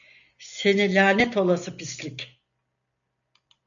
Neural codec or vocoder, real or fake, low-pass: none; real; 7.2 kHz